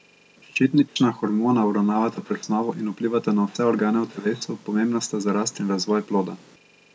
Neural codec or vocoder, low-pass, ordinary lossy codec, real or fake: none; none; none; real